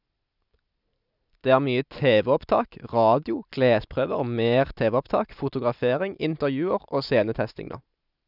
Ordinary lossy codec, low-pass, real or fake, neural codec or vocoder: none; 5.4 kHz; real; none